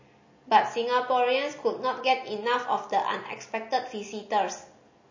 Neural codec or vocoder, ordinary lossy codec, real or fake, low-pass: none; MP3, 32 kbps; real; 7.2 kHz